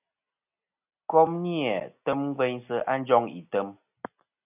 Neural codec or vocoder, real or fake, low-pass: none; real; 3.6 kHz